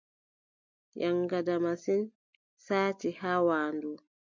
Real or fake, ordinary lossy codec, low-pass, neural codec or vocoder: real; MP3, 64 kbps; 7.2 kHz; none